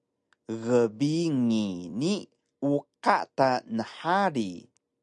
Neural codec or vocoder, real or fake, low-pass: none; real; 10.8 kHz